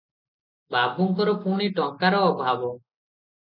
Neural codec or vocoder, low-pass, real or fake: none; 5.4 kHz; real